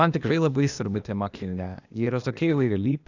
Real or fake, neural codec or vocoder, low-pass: fake; codec, 16 kHz, 0.8 kbps, ZipCodec; 7.2 kHz